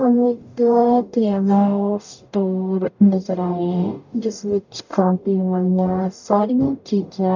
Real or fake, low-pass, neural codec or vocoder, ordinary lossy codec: fake; 7.2 kHz; codec, 44.1 kHz, 0.9 kbps, DAC; none